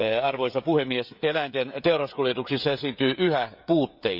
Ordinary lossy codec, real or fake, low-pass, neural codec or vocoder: none; fake; 5.4 kHz; codec, 16 kHz, 16 kbps, FreqCodec, smaller model